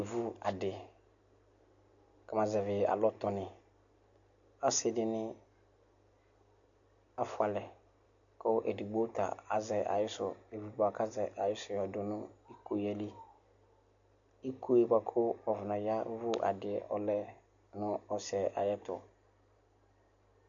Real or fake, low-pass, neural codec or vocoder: real; 7.2 kHz; none